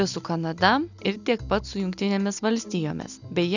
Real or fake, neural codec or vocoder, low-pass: real; none; 7.2 kHz